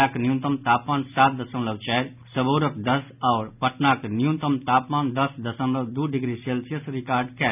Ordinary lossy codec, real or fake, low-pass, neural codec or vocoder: none; real; 3.6 kHz; none